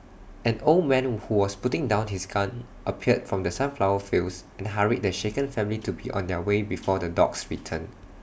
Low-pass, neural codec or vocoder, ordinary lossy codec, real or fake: none; none; none; real